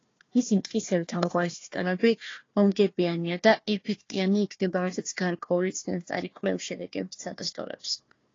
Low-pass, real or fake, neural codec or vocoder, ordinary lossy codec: 7.2 kHz; fake; codec, 16 kHz, 1 kbps, FunCodec, trained on Chinese and English, 50 frames a second; AAC, 32 kbps